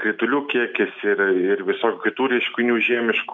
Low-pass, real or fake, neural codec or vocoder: 7.2 kHz; real; none